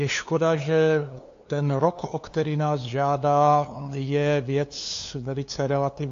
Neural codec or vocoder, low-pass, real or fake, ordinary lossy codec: codec, 16 kHz, 2 kbps, FunCodec, trained on LibriTTS, 25 frames a second; 7.2 kHz; fake; AAC, 48 kbps